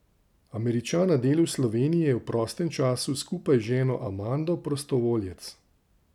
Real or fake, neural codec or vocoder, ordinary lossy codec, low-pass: real; none; none; 19.8 kHz